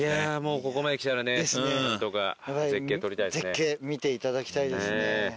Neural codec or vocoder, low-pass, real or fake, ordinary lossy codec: none; none; real; none